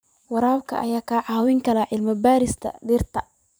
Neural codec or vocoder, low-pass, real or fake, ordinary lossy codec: vocoder, 44.1 kHz, 128 mel bands every 512 samples, BigVGAN v2; none; fake; none